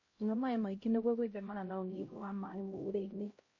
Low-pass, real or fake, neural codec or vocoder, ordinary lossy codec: 7.2 kHz; fake; codec, 16 kHz, 0.5 kbps, X-Codec, HuBERT features, trained on LibriSpeech; MP3, 32 kbps